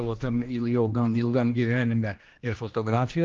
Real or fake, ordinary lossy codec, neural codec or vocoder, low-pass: fake; Opus, 32 kbps; codec, 16 kHz, 1 kbps, X-Codec, HuBERT features, trained on general audio; 7.2 kHz